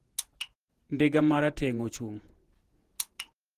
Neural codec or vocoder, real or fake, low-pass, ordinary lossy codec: vocoder, 48 kHz, 128 mel bands, Vocos; fake; 14.4 kHz; Opus, 16 kbps